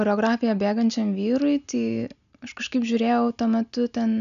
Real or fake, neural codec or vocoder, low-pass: real; none; 7.2 kHz